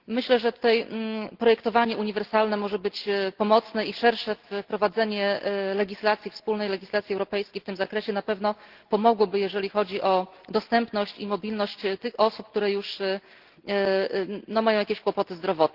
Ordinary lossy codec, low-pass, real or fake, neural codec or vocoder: Opus, 16 kbps; 5.4 kHz; real; none